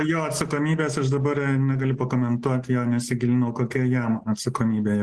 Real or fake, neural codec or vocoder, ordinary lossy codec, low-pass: real; none; Opus, 16 kbps; 10.8 kHz